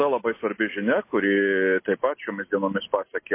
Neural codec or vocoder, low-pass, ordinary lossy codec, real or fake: none; 3.6 kHz; MP3, 24 kbps; real